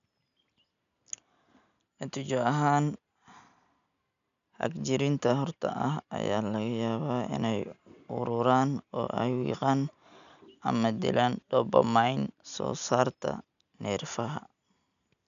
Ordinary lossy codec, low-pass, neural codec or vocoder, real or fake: none; 7.2 kHz; none; real